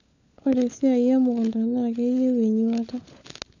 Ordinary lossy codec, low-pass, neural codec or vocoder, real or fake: none; 7.2 kHz; codec, 16 kHz, 16 kbps, FunCodec, trained on LibriTTS, 50 frames a second; fake